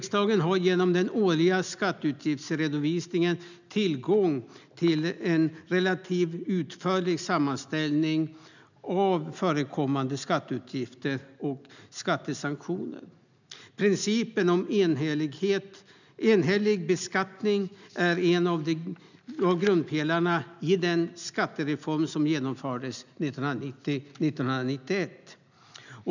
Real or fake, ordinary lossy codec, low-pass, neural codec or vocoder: real; none; 7.2 kHz; none